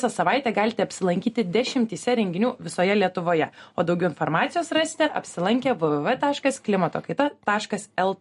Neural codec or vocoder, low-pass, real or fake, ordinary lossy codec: none; 14.4 kHz; real; MP3, 48 kbps